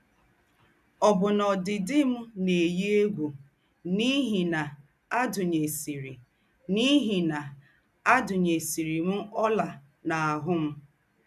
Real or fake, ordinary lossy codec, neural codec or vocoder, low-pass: real; none; none; 14.4 kHz